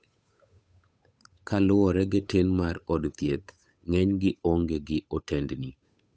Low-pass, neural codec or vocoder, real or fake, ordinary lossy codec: none; codec, 16 kHz, 8 kbps, FunCodec, trained on Chinese and English, 25 frames a second; fake; none